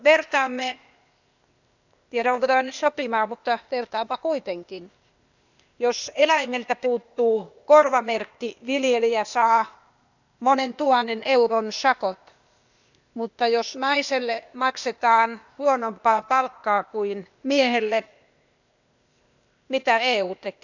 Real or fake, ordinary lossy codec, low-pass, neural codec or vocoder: fake; none; 7.2 kHz; codec, 16 kHz, 0.8 kbps, ZipCodec